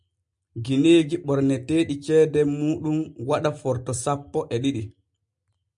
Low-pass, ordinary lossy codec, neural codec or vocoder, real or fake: 10.8 kHz; MP3, 64 kbps; none; real